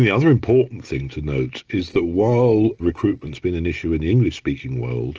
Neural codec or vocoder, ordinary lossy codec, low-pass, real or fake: none; Opus, 16 kbps; 7.2 kHz; real